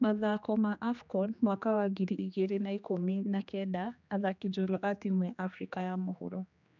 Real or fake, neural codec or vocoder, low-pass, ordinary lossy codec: fake; codec, 16 kHz, 2 kbps, X-Codec, HuBERT features, trained on general audio; 7.2 kHz; none